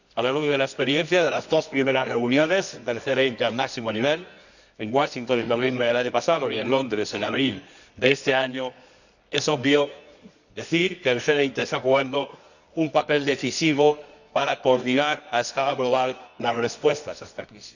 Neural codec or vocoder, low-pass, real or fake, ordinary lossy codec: codec, 24 kHz, 0.9 kbps, WavTokenizer, medium music audio release; 7.2 kHz; fake; none